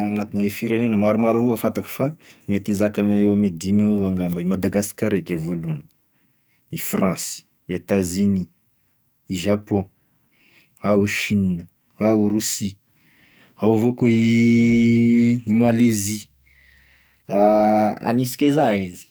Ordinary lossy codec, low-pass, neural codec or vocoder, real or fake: none; none; codec, 44.1 kHz, 2.6 kbps, SNAC; fake